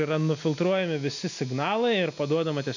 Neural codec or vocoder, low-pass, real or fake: none; 7.2 kHz; real